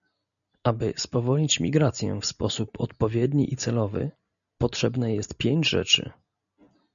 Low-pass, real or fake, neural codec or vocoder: 7.2 kHz; real; none